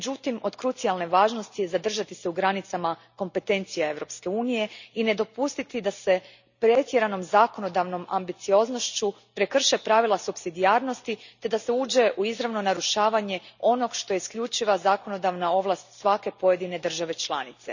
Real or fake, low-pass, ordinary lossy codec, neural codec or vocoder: real; 7.2 kHz; none; none